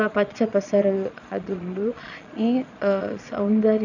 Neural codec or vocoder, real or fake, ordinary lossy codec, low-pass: vocoder, 22.05 kHz, 80 mel bands, Vocos; fake; none; 7.2 kHz